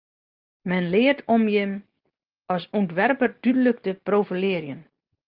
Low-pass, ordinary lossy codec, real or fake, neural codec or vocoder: 5.4 kHz; Opus, 32 kbps; real; none